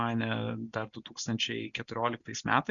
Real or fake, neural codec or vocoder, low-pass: real; none; 7.2 kHz